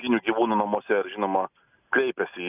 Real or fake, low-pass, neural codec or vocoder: real; 3.6 kHz; none